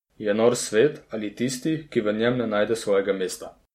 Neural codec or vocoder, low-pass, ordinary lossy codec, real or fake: none; 19.8 kHz; MP3, 64 kbps; real